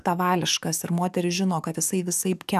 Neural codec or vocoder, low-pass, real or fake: codec, 44.1 kHz, 7.8 kbps, DAC; 14.4 kHz; fake